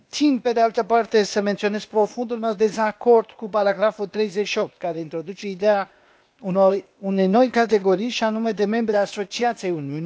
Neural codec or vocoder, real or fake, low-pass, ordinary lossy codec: codec, 16 kHz, 0.8 kbps, ZipCodec; fake; none; none